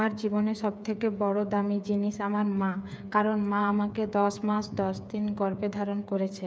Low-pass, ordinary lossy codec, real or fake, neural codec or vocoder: none; none; fake; codec, 16 kHz, 8 kbps, FreqCodec, smaller model